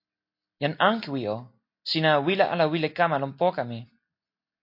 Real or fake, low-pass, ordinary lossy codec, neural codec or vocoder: real; 5.4 kHz; MP3, 32 kbps; none